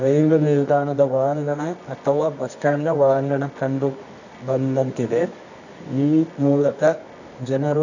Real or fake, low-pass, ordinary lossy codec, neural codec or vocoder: fake; 7.2 kHz; none; codec, 24 kHz, 0.9 kbps, WavTokenizer, medium music audio release